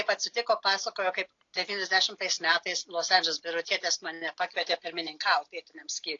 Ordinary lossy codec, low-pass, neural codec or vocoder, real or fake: AAC, 48 kbps; 7.2 kHz; none; real